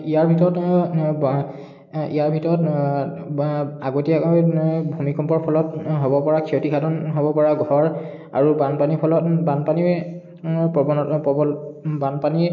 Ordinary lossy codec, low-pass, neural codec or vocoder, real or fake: none; 7.2 kHz; none; real